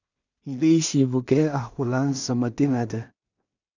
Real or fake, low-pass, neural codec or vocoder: fake; 7.2 kHz; codec, 16 kHz in and 24 kHz out, 0.4 kbps, LongCat-Audio-Codec, two codebook decoder